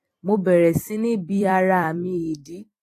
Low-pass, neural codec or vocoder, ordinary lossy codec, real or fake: 14.4 kHz; vocoder, 44.1 kHz, 128 mel bands every 256 samples, BigVGAN v2; AAC, 48 kbps; fake